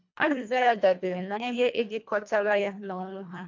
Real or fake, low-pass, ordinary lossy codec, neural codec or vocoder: fake; 7.2 kHz; MP3, 64 kbps; codec, 24 kHz, 1.5 kbps, HILCodec